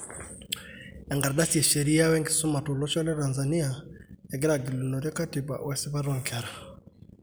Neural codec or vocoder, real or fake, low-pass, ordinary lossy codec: none; real; none; none